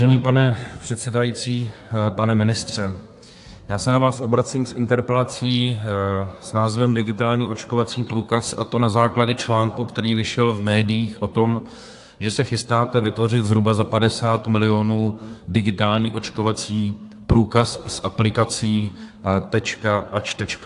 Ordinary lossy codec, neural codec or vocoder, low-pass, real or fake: AAC, 64 kbps; codec, 24 kHz, 1 kbps, SNAC; 10.8 kHz; fake